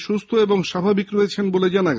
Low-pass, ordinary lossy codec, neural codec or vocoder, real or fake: none; none; none; real